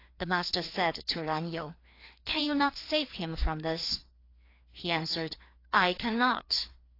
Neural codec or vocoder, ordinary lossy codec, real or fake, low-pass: codec, 16 kHz, 2 kbps, FreqCodec, larger model; AAC, 32 kbps; fake; 5.4 kHz